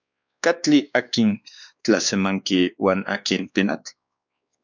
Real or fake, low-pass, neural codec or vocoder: fake; 7.2 kHz; codec, 16 kHz, 2 kbps, X-Codec, WavLM features, trained on Multilingual LibriSpeech